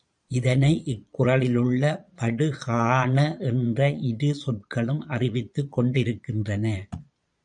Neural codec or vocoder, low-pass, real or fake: vocoder, 22.05 kHz, 80 mel bands, Vocos; 9.9 kHz; fake